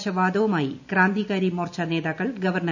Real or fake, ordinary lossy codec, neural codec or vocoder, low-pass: real; none; none; 7.2 kHz